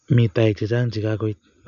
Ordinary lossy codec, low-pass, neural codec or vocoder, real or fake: AAC, 96 kbps; 7.2 kHz; none; real